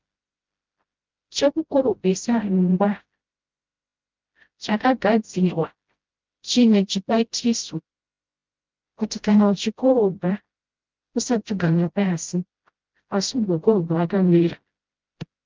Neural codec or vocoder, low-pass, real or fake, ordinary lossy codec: codec, 16 kHz, 0.5 kbps, FreqCodec, smaller model; 7.2 kHz; fake; Opus, 32 kbps